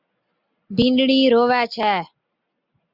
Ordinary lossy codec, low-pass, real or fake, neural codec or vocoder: Opus, 64 kbps; 5.4 kHz; real; none